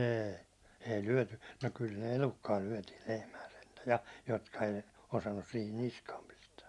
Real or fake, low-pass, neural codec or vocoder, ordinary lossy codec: real; none; none; none